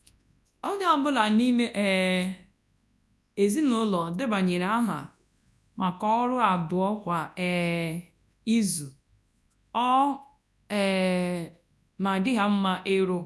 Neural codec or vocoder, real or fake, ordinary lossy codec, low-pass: codec, 24 kHz, 0.9 kbps, WavTokenizer, large speech release; fake; none; none